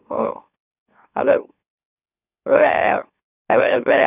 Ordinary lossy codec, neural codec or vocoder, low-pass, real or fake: none; autoencoder, 44.1 kHz, a latent of 192 numbers a frame, MeloTTS; 3.6 kHz; fake